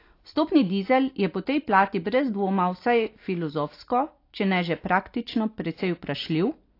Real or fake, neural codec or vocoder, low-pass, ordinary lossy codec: real; none; 5.4 kHz; AAC, 32 kbps